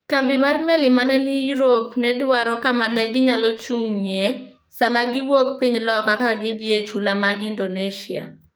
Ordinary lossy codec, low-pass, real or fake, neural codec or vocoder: none; none; fake; codec, 44.1 kHz, 2.6 kbps, SNAC